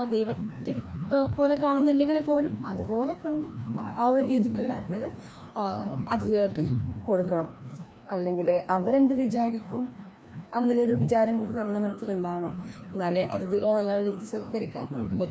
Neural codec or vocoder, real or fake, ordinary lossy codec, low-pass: codec, 16 kHz, 1 kbps, FreqCodec, larger model; fake; none; none